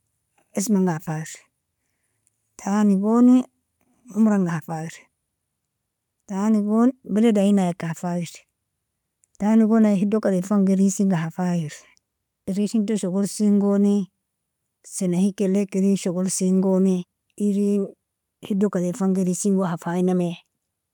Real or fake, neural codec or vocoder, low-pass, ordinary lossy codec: real; none; 19.8 kHz; none